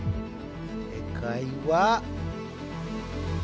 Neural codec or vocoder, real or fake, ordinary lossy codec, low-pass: none; real; none; none